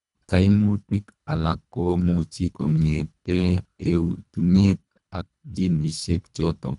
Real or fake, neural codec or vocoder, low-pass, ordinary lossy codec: fake; codec, 24 kHz, 1.5 kbps, HILCodec; 10.8 kHz; none